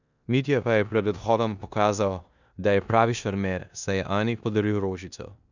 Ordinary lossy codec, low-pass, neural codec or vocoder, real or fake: none; 7.2 kHz; codec, 16 kHz in and 24 kHz out, 0.9 kbps, LongCat-Audio-Codec, four codebook decoder; fake